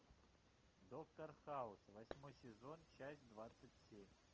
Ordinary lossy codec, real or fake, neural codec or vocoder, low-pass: Opus, 24 kbps; real; none; 7.2 kHz